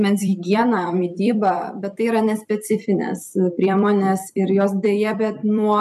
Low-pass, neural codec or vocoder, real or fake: 14.4 kHz; vocoder, 44.1 kHz, 128 mel bands every 256 samples, BigVGAN v2; fake